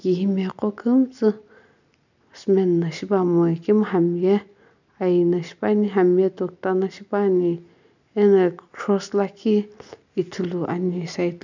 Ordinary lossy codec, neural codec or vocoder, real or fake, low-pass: none; none; real; 7.2 kHz